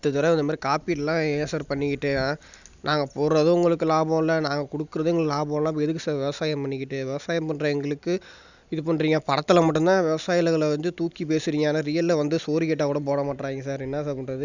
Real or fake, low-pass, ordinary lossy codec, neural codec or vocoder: real; 7.2 kHz; none; none